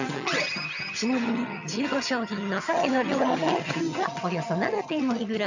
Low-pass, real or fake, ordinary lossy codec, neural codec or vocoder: 7.2 kHz; fake; none; vocoder, 22.05 kHz, 80 mel bands, HiFi-GAN